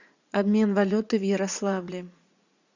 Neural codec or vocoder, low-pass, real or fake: vocoder, 44.1 kHz, 80 mel bands, Vocos; 7.2 kHz; fake